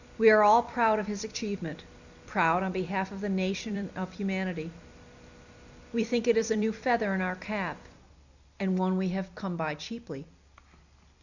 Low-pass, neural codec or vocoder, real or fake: 7.2 kHz; vocoder, 44.1 kHz, 128 mel bands every 256 samples, BigVGAN v2; fake